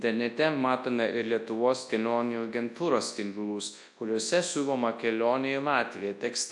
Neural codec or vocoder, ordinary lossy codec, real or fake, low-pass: codec, 24 kHz, 0.9 kbps, WavTokenizer, large speech release; AAC, 64 kbps; fake; 10.8 kHz